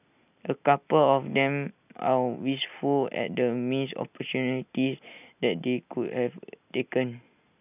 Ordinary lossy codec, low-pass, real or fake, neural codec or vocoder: none; 3.6 kHz; real; none